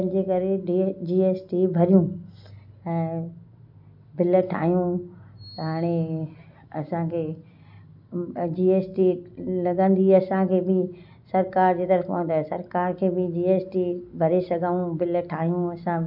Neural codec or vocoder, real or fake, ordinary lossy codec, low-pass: none; real; MP3, 48 kbps; 5.4 kHz